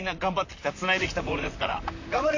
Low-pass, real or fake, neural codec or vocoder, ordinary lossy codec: 7.2 kHz; fake; vocoder, 44.1 kHz, 128 mel bands, Pupu-Vocoder; AAC, 48 kbps